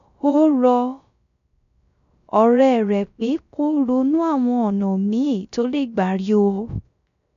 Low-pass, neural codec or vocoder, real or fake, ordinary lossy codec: 7.2 kHz; codec, 16 kHz, 0.3 kbps, FocalCodec; fake; none